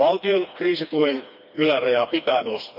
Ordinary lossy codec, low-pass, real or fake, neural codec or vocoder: none; 5.4 kHz; fake; codec, 16 kHz, 2 kbps, FreqCodec, smaller model